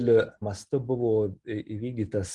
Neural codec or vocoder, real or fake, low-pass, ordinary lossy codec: none; real; 10.8 kHz; Opus, 24 kbps